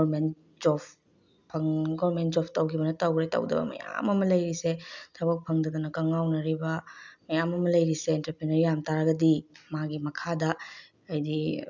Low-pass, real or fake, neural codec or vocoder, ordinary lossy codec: 7.2 kHz; real; none; none